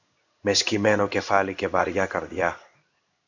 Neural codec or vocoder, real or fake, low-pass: codec, 16 kHz in and 24 kHz out, 1 kbps, XY-Tokenizer; fake; 7.2 kHz